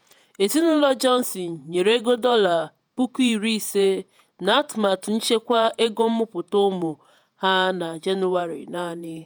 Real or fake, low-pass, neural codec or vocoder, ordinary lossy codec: fake; none; vocoder, 48 kHz, 128 mel bands, Vocos; none